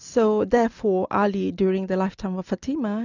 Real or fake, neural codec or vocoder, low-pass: real; none; 7.2 kHz